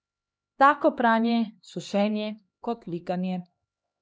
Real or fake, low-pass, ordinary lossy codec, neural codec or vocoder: fake; none; none; codec, 16 kHz, 2 kbps, X-Codec, HuBERT features, trained on LibriSpeech